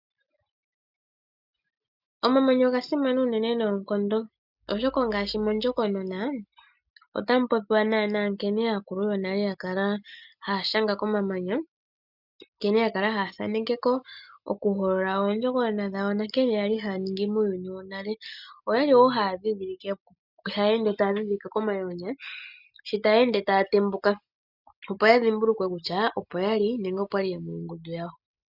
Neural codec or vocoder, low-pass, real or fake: none; 5.4 kHz; real